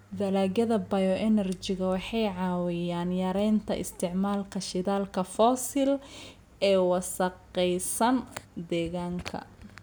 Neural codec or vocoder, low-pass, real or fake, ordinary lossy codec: none; none; real; none